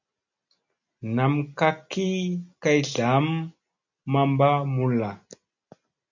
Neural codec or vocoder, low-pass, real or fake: none; 7.2 kHz; real